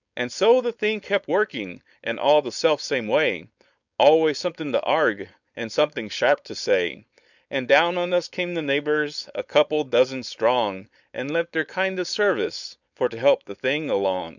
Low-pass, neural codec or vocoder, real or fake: 7.2 kHz; codec, 16 kHz, 4.8 kbps, FACodec; fake